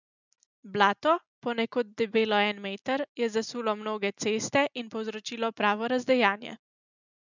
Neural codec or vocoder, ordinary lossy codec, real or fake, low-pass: none; none; real; 7.2 kHz